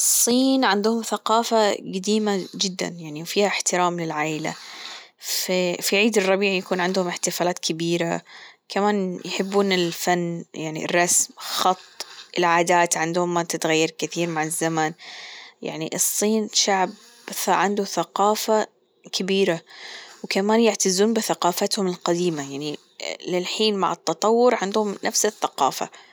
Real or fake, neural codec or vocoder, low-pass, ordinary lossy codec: real; none; none; none